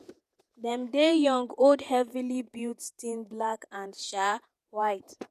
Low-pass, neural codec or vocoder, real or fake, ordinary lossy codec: 14.4 kHz; vocoder, 48 kHz, 128 mel bands, Vocos; fake; none